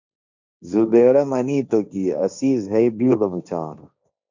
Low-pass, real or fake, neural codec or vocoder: 7.2 kHz; fake; codec, 16 kHz, 1.1 kbps, Voila-Tokenizer